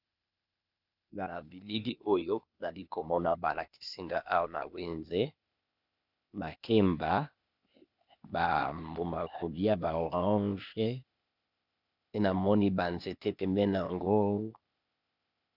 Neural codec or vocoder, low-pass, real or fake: codec, 16 kHz, 0.8 kbps, ZipCodec; 5.4 kHz; fake